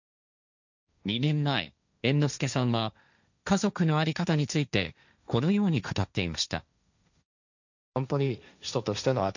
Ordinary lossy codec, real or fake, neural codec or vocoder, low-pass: none; fake; codec, 16 kHz, 1.1 kbps, Voila-Tokenizer; 7.2 kHz